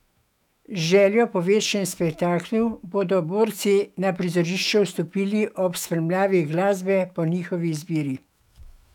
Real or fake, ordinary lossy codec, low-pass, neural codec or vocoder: fake; none; 19.8 kHz; autoencoder, 48 kHz, 128 numbers a frame, DAC-VAE, trained on Japanese speech